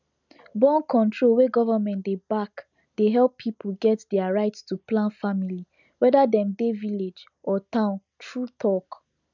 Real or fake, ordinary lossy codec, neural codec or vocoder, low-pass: real; none; none; 7.2 kHz